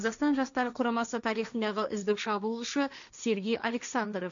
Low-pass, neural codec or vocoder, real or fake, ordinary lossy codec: 7.2 kHz; codec, 16 kHz, 1.1 kbps, Voila-Tokenizer; fake; none